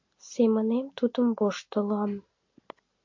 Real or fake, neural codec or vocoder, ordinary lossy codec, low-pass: real; none; MP3, 48 kbps; 7.2 kHz